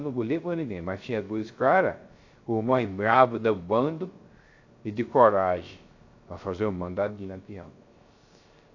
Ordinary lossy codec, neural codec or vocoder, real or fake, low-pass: AAC, 48 kbps; codec, 16 kHz, 0.3 kbps, FocalCodec; fake; 7.2 kHz